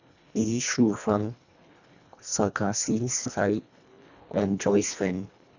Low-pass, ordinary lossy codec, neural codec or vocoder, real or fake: 7.2 kHz; none; codec, 24 kHz, 1.5 kbps, HILCodec; fake